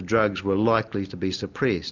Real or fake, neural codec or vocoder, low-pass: real; none; 7.2 kHz